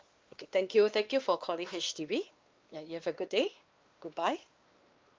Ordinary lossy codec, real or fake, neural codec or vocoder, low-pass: Opus, 24 kbps; fake; codec, 16 kHz, 2 kbps, X-Codec, WavLM features, trained on Multilingual LibriSpeech; 7.2 kHz